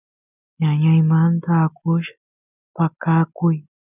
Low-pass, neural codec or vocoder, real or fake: 3.6 kHz; none; real